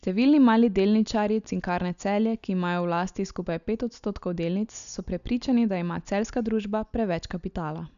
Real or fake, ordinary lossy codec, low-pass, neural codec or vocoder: real; none; 7.2 kHz; none